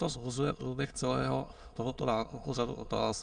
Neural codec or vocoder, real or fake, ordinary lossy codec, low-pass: autoencoder, 22.05 kHz, a latent of 192 numbers a frame, VITS, trained on many speakers; fake; Opus, 64 kbps; 9.9 kHz